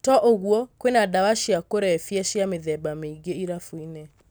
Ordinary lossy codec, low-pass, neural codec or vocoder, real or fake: none; none; none; real